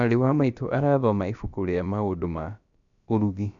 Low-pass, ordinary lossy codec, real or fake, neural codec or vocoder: 7.2 kHz; none; fake; codec, 16 kHz, about 1 kbps, DyCAST, with the encoder's durations